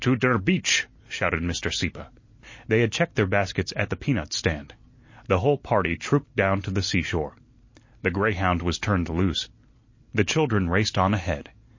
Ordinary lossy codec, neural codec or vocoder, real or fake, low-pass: MP3, 32 kbps; none; real; 7.2 kHz